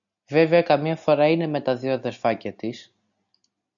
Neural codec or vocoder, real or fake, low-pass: none; real; 7.2 kHz